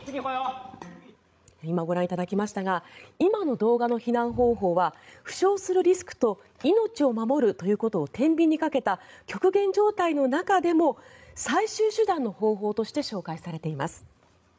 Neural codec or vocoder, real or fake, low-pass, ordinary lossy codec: codec, 16 kHz, 16 kbps, FreqCodec, larger model; fake; none; none